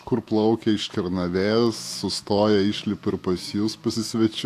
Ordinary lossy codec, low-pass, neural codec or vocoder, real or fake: AAC, 64 kbps; 14.4 kHz; autoencoder, 48 kHz, 128 numbers a frame, DAC-VAE, trained on Japanese speech; fake